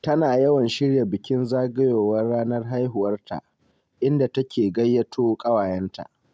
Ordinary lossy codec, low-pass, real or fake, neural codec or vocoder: none; none; real; none